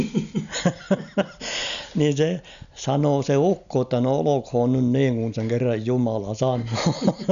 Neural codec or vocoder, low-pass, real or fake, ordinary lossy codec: none; 7.2 kHz; real; none